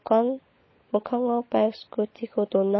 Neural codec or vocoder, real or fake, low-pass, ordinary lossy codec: codec, 16 kHz, 16 kbps, FunCodec, trained on LibriTTS, 50 frames a second; fake; 7.2 kHz; MP3, 24 kbps